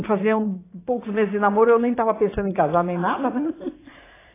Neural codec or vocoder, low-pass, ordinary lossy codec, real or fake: vocoder, 44.1 kHz, 80 mel bands, Vocos; 3.6 kHz; AAC, 16 kbps; fake